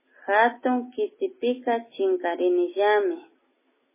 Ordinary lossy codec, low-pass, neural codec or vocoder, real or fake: MP3, 16 kbps; 3.6 kHz; none; real